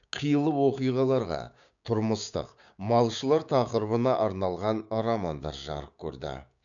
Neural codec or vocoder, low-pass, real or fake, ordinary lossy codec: codec, 16 kHz, 6 kbps, DAC; 7.2 kHz; fake; none